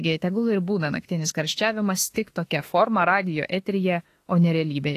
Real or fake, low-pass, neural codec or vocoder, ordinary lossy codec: fake; 14.4 kHz; autoencoder, 48 kHz, 32 numbers a frame, DAC-VAE, trained on Japanese speech; AAC, 48 kbps